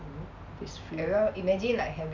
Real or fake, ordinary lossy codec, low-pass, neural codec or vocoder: real; MP3, 64 kbps; 7.2 kHz; none